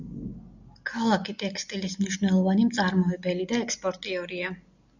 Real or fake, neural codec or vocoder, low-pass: real; none; 7.2 kHz